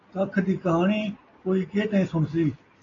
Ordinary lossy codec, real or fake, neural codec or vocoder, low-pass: AAC, 32 kbps; real; none; 7.2 kHz